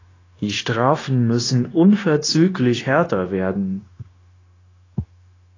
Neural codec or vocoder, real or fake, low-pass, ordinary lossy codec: codec, 16 kHz, 0.9 kbps, LongCat-Audio-Codec; fake; 7.2 kHz; AAC, 32 kbps